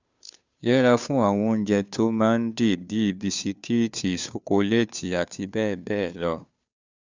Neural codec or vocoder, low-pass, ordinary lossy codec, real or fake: codec, 16 kHz, 2 kbps, FunCodec, trained on Chinese and English, 25 frames a second; 7.2 kHz; Opus, 64 kbps; fake